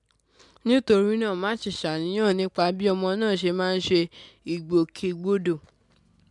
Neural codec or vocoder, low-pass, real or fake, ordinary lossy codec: none; 10.8 kHz; real; MP3, 96 kbps